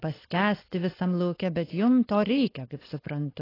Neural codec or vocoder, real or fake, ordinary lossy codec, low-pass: codec, 16 kHz, 4.8 kbps, FACodec; fake; AAC, 24 kbps; 5.4 kHz